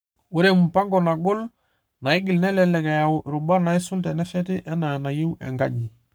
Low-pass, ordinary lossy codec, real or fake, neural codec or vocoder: none; none; fake; codec, 44.1 kHz, 7.8 kbps, Pupu-Codec